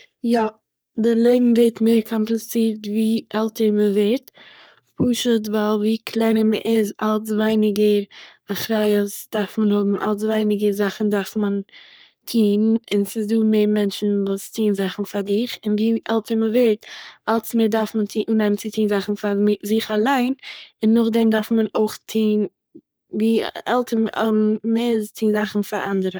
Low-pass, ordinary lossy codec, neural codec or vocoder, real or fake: none; none; codec, 44.1 kHz, 3.4 kbps, Pupu-Codec; fake